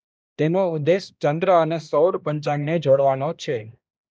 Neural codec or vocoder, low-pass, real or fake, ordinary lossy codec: codec, 16 kHz, 1 kbps, X-Codec, HuBERT features, trained on balanced general audio; none; fake; none